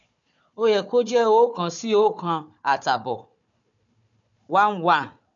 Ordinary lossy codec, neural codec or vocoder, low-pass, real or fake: none; codec, 16 kHz, 4 kbps, FunCodec, trained on Chinese and English, 50 frames a second; 7.2 kHz; fake